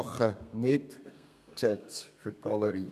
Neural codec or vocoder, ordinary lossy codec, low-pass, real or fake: codec, 32 kHz, 1.9 kbps, SNAC; none; 14.4 kHz; fake